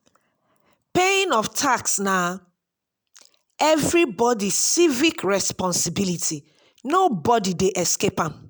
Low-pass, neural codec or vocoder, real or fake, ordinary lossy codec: none; none; real; none